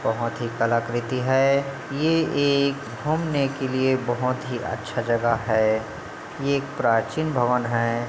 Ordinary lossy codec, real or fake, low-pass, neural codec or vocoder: none; real; none; none